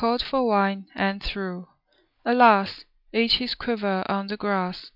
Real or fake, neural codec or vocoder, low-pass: real; none; 5.4 kHz